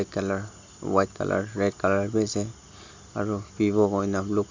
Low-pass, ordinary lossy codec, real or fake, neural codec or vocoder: 7.2 kHz; MP3, 64 kbps; real; none